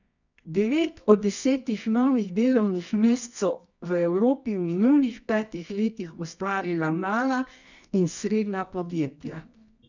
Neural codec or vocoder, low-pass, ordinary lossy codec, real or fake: codec, 24 kHz, 0.9 kbps, WavTokenizer, medium music audio release; 7.2 kHz; none; fake